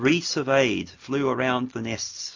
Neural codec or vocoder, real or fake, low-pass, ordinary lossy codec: none; real; 7.2 kHz; AAC, 48 kbps